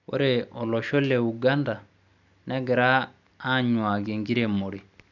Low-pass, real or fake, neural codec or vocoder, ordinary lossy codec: 7.2 kHz; real; none; none